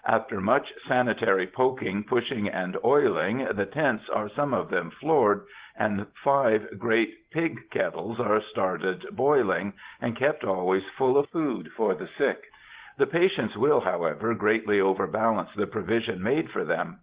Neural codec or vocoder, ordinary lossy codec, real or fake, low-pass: none; Opus, 16 kbps; real; 3.6 kHz